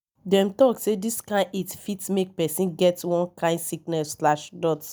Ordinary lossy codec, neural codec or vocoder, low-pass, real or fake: none; none; none; real